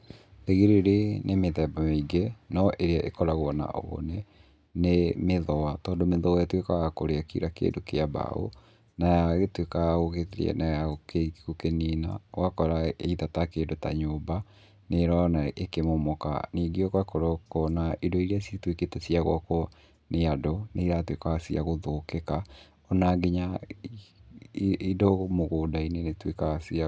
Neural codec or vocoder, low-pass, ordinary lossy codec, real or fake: none; none; none; real